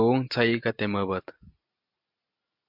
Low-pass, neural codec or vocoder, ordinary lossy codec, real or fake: 5.4 kHz; none; MP3, 48 kbps; real